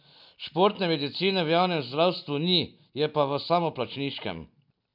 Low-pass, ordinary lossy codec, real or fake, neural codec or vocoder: 5.4 kHz; none; real; none